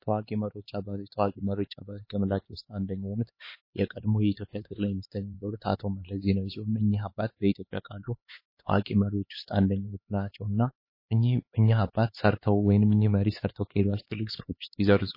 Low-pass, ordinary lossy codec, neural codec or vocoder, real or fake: 5.4 kHz; MP3, 24 kbps; codec, 16 kHz, 4 kbps, X-Codec, HuBERT features, trained on LibriSpeech; fake